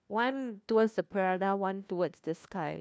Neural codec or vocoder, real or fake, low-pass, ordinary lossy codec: codec, 16 kHz, 1 kbps, FunCodec, trained on LibriTTS, 50 frames a second; fake; none; none